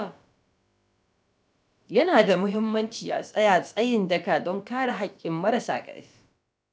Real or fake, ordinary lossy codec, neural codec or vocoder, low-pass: fake; none; codec, 16 kHz, about 1 kbps, DyCAST, with the encoder's durations; none